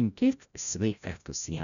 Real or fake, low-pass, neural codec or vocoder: fake; 7.2 kHz; codec, 16 kHz, 0.5 kbps, FreqCodec, larger model